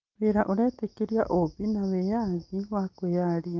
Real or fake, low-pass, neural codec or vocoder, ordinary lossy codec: real; 7.2 kHz; none; Opus, 32 kbps